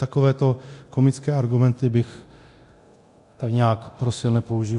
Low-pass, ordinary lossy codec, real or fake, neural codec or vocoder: 10.8 kHz; AAC, 64 kbps; fake; codec, 24 kHz, 0.9 kbps, DualCodec